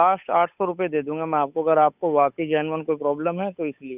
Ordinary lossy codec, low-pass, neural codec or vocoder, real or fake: none; 3.6 kHz; codec, 16 kHz, 8 kbps, FunCodec, trained on Chinese and English, 25 frames a second; fake